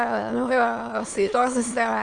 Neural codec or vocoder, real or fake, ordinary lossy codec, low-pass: autoencoder, 22.05 kHz, a latent of 192 numbers a frame, VITS, trained on many speakers; fake; Opus, 32 kbps; 9.9 kHz